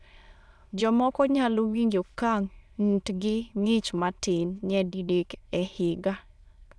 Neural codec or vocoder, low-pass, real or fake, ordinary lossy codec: autoencoder, 22.05 kHz, a latent of 192 numbers a frame, VITS, trained on many speakers; none; fake; none